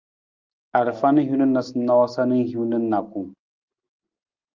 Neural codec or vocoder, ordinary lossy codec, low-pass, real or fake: none; Opus, 32 kbps; 7.2 kHz; real